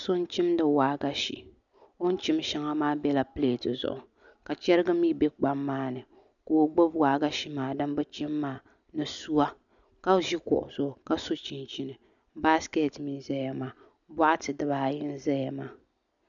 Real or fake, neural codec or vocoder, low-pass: real; none; 7.2 kHz